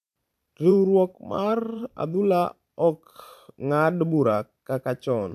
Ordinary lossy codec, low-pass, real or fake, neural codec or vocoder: AAC, 96 kbps; 14.4 kHz; fake; vocoder, 44.1 kHz, 128 mel bands every 512 samples, BigVGAN v2